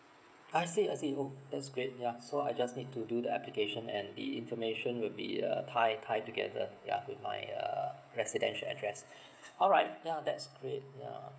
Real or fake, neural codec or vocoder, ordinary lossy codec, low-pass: fake; codec, 16 kHz, 16 kbps, FreqCodec, smaller model; none; none